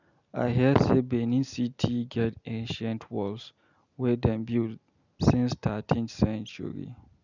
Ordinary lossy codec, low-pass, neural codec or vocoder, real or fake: none; 7.2 kHz; none; real